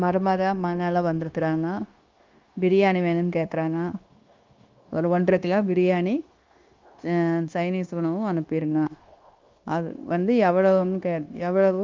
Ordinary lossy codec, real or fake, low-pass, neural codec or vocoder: Opus, 32 kbps; fake; 7.2 kHz; codec, 16 kHz, 0.9 kbps, LongCat-Audio-Codec